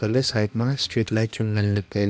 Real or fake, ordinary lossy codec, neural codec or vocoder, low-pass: fake; none; codec, 16 kHz, 1 kbps, X-Codec, HuBERT features, trained on balanced general audio; none